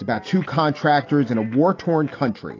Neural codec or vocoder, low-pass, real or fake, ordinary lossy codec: none; 7.2 kHz; real; AAC, 32 kbps